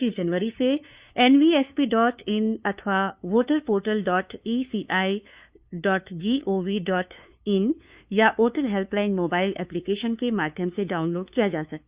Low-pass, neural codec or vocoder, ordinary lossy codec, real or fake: 3.6 kHz; codec, 16 kHz, 2 kbps, FunCodec, trained on LibriTTS, 25 frames a second; Opus, 64 kbps; fake